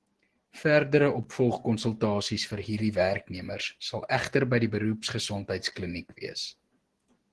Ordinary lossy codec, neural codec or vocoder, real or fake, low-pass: Opus, 16 kbps; none; real; 10.8 kHz